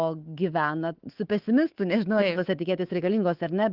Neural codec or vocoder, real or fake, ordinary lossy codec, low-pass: codec, 24 kHz, 3.1 kbps, DualCodec; fake; Opus, 16 kbps; 5.4 kHz